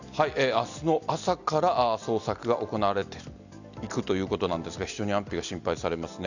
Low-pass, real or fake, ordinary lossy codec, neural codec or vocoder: 7.2 kHz; real; none; none